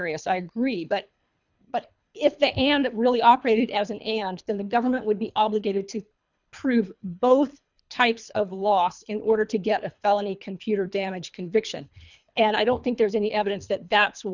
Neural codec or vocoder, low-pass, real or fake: codec, 24 kHz, 3 kbps, HILCodec; 7.2 kHz; fake